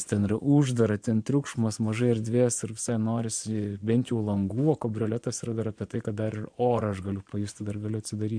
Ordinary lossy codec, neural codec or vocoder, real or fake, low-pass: MP3, 64 kbps; vocoder, 24 kHz, 100 mel bands, Vocos; fake; 9.9 kHz